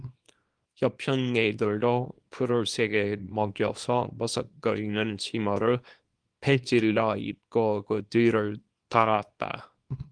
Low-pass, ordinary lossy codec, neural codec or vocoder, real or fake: 9.9 kHz; Opus, 24 kbps; codec, 24 kHz, 0.9 kbps, WavTokenizer, small release; fake